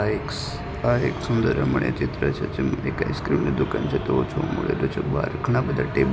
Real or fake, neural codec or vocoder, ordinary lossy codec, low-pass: real; none; none; none